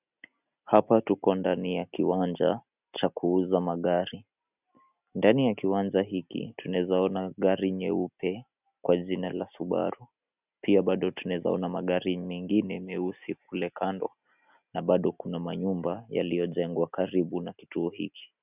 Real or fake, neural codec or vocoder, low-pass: real; none; 3.6 kHz